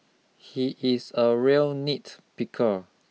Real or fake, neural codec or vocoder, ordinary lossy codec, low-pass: real; none; none; none